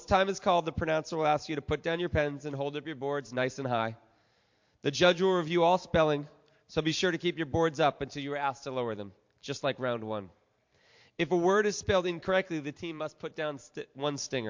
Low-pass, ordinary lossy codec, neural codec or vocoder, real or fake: 7.2 kHz; MP3, 48 kbps; vocoder, 44.1 kHz, 128 mel bands every 512 samples, BigVGAN v2; fake